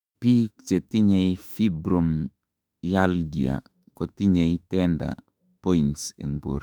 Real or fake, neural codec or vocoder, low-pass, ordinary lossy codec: fake; autoencoder, 48 kHz, 32 numbers a frame, DAC-VAE, trained on Japanese speech; 19.8 kHz; none